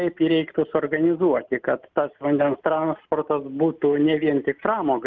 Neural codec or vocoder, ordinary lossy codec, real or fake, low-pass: none; Opus, 24 kbps; real; 7.2 kHz